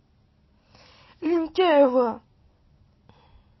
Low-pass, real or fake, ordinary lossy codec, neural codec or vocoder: 7.2 kHz; real; MP3, 24 kbps; none